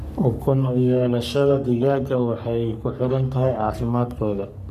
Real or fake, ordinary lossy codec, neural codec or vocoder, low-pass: fake; none; codec, 44.1 kHz, 3.4 kbps, Pupu-Codec; 14.4 kHz